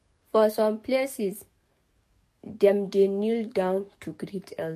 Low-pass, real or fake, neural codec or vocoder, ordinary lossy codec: 14.4 kHz; fake; codec, 44.1 kHz, 7.8 kbps, DAC; MP3, 64 kbps